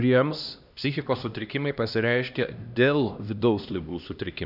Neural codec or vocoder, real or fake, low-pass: codec, 16 kHz, 1 kbps, X-Codec, HuBERT features, trained on LibriSpeech; fake; 5.4 kHz